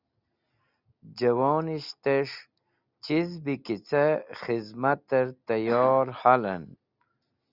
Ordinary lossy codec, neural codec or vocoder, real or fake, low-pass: Opus, 64 kbps; none; real; 5.4 kHz